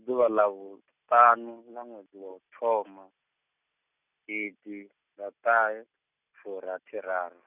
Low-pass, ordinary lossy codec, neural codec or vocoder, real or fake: 3.6 kHz; none; none; real